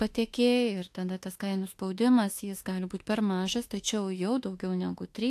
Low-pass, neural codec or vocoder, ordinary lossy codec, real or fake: 14.4 kHz; autoencoder, 48 kHz, 32 numbers a frame, DAC-VAE, trained on Japanese speech; AAC, 64 kbps; fake